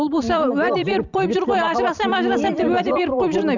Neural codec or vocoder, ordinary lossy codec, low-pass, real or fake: vocoder, 44.1 kHz, 128 mel bands every 256 samples, BigVGAN v2; none; 7.2 kHz; fake